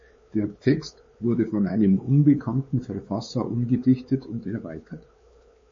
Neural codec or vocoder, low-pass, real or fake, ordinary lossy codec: codec, 16 kHz, 4 kbps, X-Codec, WavLM features, trained on Multilingual LibriSpeech; 7.2 kHz; fake; MP3, 32 kbps